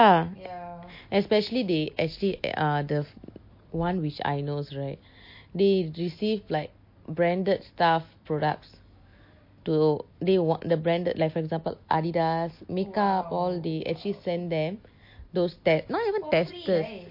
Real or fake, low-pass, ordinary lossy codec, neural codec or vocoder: real; 5.4 kHz; MP3, 32 kbps; none